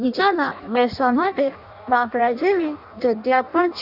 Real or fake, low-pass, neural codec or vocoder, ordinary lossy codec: fake; 5.4 kHz; codec, 16 kHz in and 24 kHz out, 0.6 kbps, FireRedTTS-2 codec; none